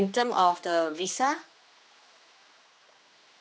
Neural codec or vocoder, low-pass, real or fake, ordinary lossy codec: codec, 16 kHz, 2 kbps, X-Codec, HuBERT features, trained on general audio; none; fake; none